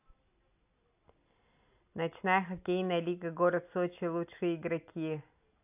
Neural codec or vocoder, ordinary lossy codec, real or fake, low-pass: none; AAC, 32 kbps; real; 3.6 kHz